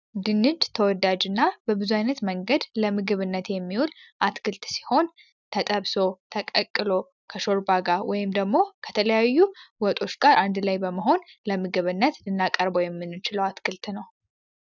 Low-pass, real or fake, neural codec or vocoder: 7.2 kHz; real; none